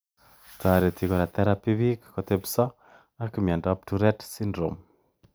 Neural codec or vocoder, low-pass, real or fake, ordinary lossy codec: none; none; real; none